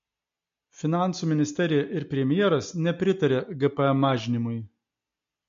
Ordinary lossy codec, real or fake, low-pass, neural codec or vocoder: MP3, 48 kbps; real; 7.2 kHz; none